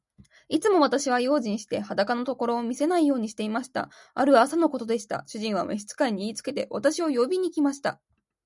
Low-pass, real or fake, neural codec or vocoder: 10.8 kHz; real; none